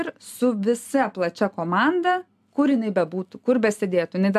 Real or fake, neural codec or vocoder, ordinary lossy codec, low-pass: real; none; AAC, 96 kbps; 14.4 kHz